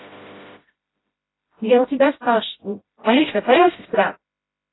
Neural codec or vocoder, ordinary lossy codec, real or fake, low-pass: codec, 16 kHz, 0.5 kbps, FreqCodec, smaller model; AAC, 16 kbps; fake; 7.2 kHz